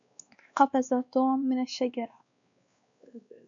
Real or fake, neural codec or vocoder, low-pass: fake; codec, 16 kHz, 2 kbps, X-Codec, WavLM features, trained on Multilingual LibriSpeech; 7.2 kHz